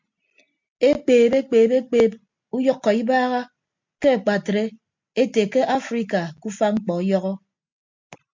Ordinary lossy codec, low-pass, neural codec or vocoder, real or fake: MP3, 48 kbps; 7.2 kHz; none; real